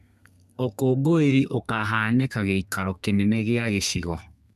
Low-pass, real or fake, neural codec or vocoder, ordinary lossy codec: 14.4 kHz; fake; codec, 32 kHz, 1.9 kbps, SNAC; none